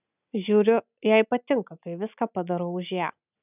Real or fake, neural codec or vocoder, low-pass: real; none; 3.6 kHz